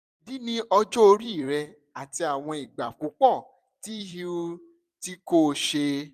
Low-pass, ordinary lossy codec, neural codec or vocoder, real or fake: 14.4 kHz; none; vocoder, 44.1 kHz, 128 mel bands every 256 samples, BigVGAN v2; fake